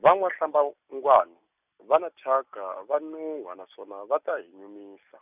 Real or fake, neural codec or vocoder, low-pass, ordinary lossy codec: real; none; 3.6 kHz; none